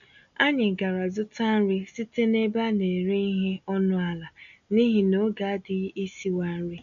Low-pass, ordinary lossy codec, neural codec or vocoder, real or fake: 7.2 kHz; none; none; real